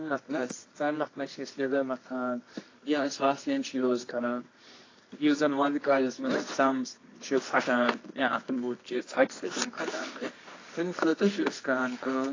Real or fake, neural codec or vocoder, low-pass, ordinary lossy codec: fake; codec, 24 kHz, 0.9 kbps, WavTokenizer, medium music audio release; 7.2 kHz; AAC, 32 kbps